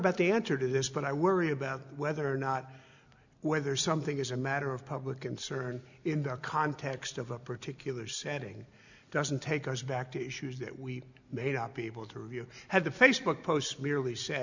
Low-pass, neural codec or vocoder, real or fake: 7.2 kHz; none; real